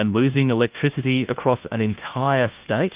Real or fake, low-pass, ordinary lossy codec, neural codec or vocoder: fake; 3.6 kHz; Opus, 32 kbps; codec, 16 kHz, 1 kbps, FunCodec, trained on LibriTTS, 50 frames a second